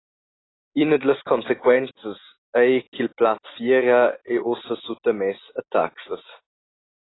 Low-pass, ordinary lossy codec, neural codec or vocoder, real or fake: 7.2 kHz; AAC, 16 kbps; none; real